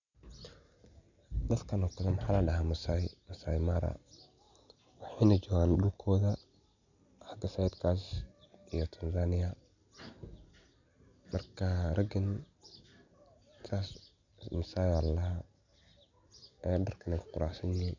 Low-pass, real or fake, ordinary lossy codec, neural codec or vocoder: 7.2 kHz; real; none; none